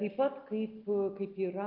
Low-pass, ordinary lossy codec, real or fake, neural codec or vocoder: 5.4 kHz; Opus, 32 kbps; real; none